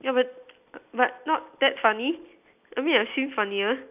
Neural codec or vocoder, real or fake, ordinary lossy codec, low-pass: none; real; none; 3.6 kHz